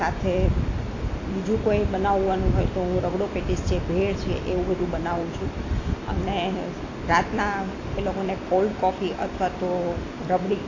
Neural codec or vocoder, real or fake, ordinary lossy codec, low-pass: none; real; AAC, 32 kbps; 7.2 kHz